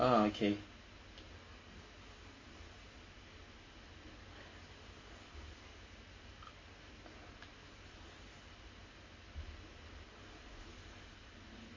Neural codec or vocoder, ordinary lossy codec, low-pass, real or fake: none; MP3, 32 kbps; 7.2 kHz; real